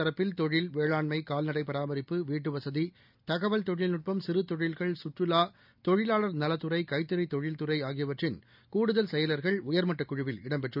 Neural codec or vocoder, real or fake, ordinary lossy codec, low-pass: none; real; none; 5.4 kHz